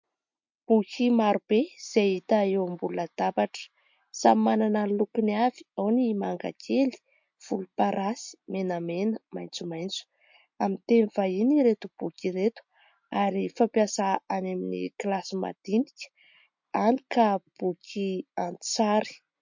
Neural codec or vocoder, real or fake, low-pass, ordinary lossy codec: none; real; 7.2 kHz; MP3, 48 kbps